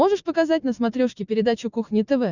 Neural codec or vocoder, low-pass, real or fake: none; 7.2 kHz; real